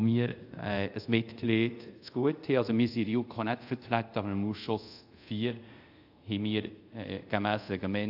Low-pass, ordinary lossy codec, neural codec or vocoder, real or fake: 5.4 kHz; AAC, 48 kbps; codec, 24 kHz, 0.5 kbps, DualCodec; fake